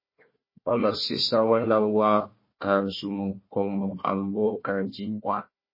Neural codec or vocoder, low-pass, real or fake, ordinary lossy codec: codec, 16 kHz, 1 kbps, FunCodec, trained on Chinese and English, 50 frames a second; 5.4 kHz; fake; MP3, 24 kbps